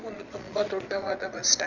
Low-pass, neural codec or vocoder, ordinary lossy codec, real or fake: 7.2 kHz; vocoder, 22.05 kHz, 80 mel bands, Vocos; none; fake